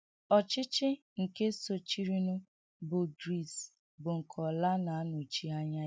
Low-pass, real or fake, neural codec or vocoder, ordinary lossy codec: none; real; none; none